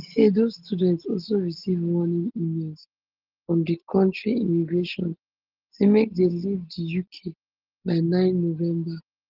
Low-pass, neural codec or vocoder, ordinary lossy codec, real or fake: 5.4 kHz; none; Opus, 16 kbps; real